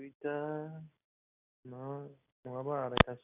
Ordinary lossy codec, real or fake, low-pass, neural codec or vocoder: AAC, 32 kbps; real; 3.6 kHz; none